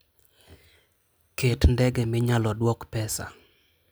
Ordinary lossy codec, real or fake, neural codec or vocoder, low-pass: none; real; none; none